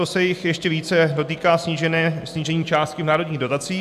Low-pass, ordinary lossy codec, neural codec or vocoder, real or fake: 14.4 kHz; AAC, 96 kbps; none; real